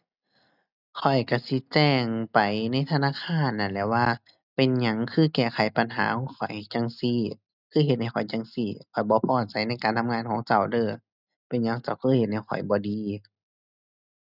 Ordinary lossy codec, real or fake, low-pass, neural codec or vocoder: none; real; 5.4 kHz; none